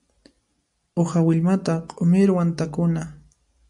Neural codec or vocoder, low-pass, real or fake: none; 10.8 kHz; real